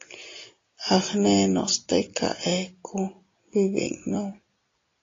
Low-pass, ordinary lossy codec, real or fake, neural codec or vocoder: 7.2 kHz; AAC, 32 kbps; real; none